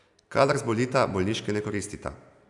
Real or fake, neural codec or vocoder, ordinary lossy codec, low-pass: real; none; none; 10.8 kHz